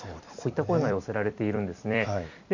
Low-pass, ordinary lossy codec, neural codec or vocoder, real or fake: 7.2 kHz; none; vocoder, 44.1 kHz, 128 mel bands every 256 samples, BigVGAN v2; fake